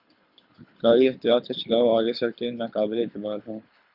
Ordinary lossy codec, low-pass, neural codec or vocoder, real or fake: Opus, 64 kbps; 5.4 kHz; codec, 24 kHz, 6 kbps, HILCodec; fake